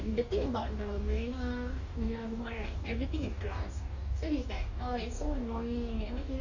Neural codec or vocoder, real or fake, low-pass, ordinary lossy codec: codec, 44.1 kHz, 2.6 kbps, DAC; fake; 7.2 kHz; none